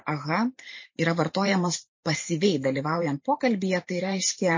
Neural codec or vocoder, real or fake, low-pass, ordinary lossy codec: vocoder, 44.1 kHz, 128 mel bands every 512 samples, BigVGAN v2; fake; 7.2 kHz; MP3, 32 kbps